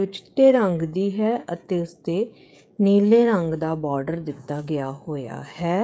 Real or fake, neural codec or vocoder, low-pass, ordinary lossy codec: fake; codec, 16 kHz, 16 kbps, FreqCodec, smaller model; none; none